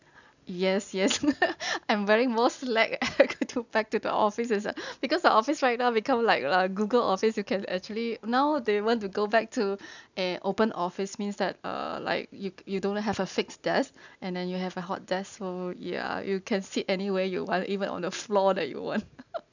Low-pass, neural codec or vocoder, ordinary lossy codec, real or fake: 7.2 kHz; none; none; real